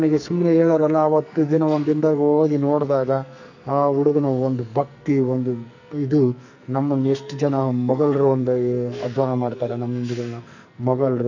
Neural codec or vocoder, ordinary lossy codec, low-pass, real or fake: codec, 44.1 kHz, 2.6 kbps, SNAC; none; 7.2 kHz; fake